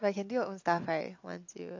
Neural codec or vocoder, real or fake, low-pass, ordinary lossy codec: none; real; 7.2 kHz; AAC, 48 kbps